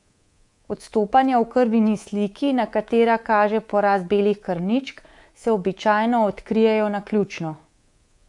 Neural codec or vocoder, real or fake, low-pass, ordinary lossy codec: codec, 24 kHz, 3.1 kbps, DualCodec; fake; 10.8 kHz; AAC, 64 kbps